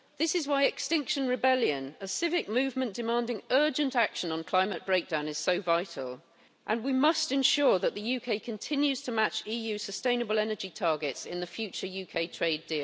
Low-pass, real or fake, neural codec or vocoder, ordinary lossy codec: none; real; none; none